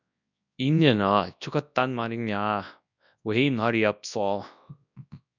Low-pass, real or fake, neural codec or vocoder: 7.2 kHz; fake; codec, 24 kHz, 0.9 kbps, WavTokenizer, large speech release